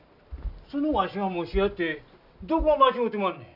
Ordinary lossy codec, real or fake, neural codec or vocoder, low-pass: none; real; none; 5.4 kHz